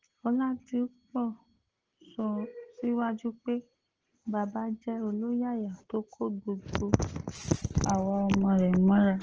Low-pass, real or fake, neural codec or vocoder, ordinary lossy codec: 7.2 kHz; real; none; Opus, 16 kbps